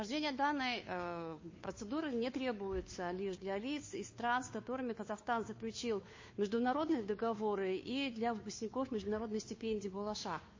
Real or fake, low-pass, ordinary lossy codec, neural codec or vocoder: fake; 7.2 kHz; MP3, 32 kbps; codec, 16 kHz, 2 kbps, FunCodec, trained on Chinese and English, 25 frames a second